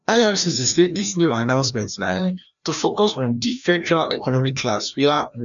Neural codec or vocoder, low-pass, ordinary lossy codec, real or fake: codec, 16 kHz, 1 kbps, FreqCodec, larger model; 7.2 kHz; none; fake